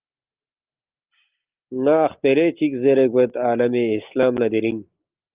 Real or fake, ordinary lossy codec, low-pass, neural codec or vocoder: real; Opus, 24 kbps; 3.6 kHz; none